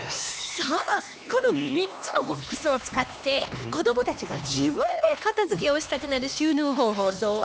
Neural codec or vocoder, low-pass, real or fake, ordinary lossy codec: codec, 16 kHz, 2 kbps, X-Codec, HuBERT features, trained on LibriSpeech; none; fake; none